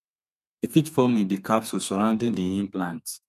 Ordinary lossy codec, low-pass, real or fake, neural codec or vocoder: none; 14.4 kHz; fake; codec, 32 kHz, 1.9 kbps, SNAC